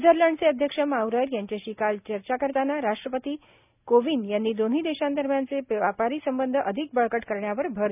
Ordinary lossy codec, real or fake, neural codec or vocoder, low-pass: none; real; none; 3.6 kHz